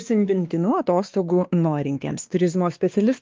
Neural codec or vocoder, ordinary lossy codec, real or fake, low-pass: codec, 16 kHz, 2 kbps, X-Codec, WavLM features, trained on Multilingual LibriSpeech; Opus, 32 kbps; fake; 7.2 kHz